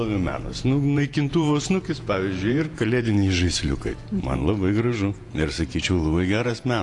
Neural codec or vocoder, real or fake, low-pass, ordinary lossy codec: vocoder, 48 kHz, 128 mel bands, Vocos; fake; 10.8 kHz; AAC, 48 kbps